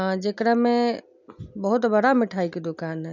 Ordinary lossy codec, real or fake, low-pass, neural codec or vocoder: none; real; 7.2 kHz; none